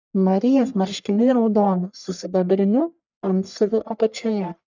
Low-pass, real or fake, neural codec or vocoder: 7.2 kHz; fake; codec, 44.1 kHz, 1.7 kbps, Pupu-Codec